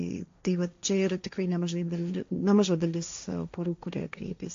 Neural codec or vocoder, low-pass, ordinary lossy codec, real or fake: codec, 16 kHz, 1.1 kbps, Voila-Tokenizer; 7.2 kHz; AAC, 48 kbps; fake